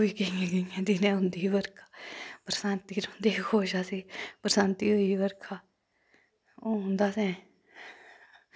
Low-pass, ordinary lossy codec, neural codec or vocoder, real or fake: none; none; none; real